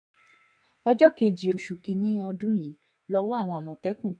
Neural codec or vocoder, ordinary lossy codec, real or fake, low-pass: codec, 32 kHz, 1.9 kbps, SNAC; none; fake; 9.9 kHz